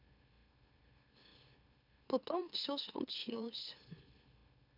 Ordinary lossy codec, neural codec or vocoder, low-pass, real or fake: none; autoencoder, 44.1 kHz, a latent of 192 numbers a frame, MeloTTS; 5.4 kHz; fake